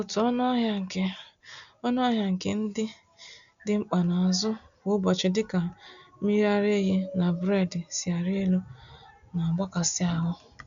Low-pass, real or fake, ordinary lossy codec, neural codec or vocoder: 7.2 kHz; real; none; none